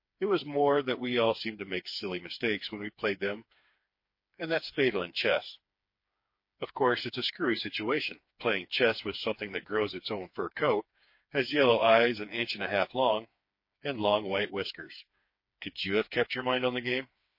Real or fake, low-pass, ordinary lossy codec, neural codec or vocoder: fake; 5.4 kHz; MP3, 32 kbps; codec, 16 kHz, 4 kbps, FreqCodec, smaller model